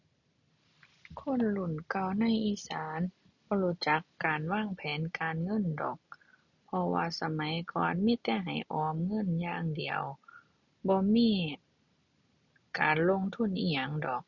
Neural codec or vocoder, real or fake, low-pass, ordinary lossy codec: none; real; 7.2 kHz; none